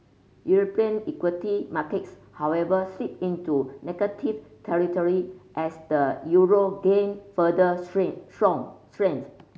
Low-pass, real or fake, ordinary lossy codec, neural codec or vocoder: none; real; none; none